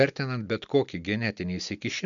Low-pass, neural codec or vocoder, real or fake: 7.2 kHz; none; real